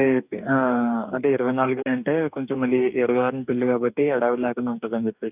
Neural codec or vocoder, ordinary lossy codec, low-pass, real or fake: codec, 44.1 kHz, 2.6 kbps, DAC; none; 3.6 kHz; fake